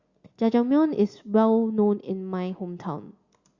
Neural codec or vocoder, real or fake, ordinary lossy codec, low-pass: none; real; Opus, 64 kbps; 7.2 kHz